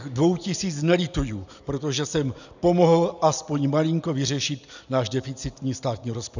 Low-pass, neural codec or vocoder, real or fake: 7.2 kHz; none; real